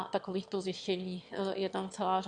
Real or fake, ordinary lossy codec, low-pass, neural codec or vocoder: fake; MP3, 64 kbps; 9.9 kHz; autoencoder, 22.05 kHz, a latent of 192 numbers a frame, VITS, trained on one speaker